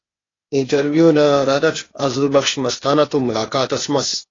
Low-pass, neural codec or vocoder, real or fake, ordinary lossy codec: 7.2 kHz; codec, 16 kHz, 0.8 kbps, ZipCodec; fake; AAC, 32 kbps